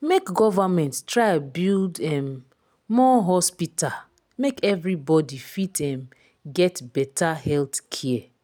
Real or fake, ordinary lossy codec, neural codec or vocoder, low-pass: real; none; none; none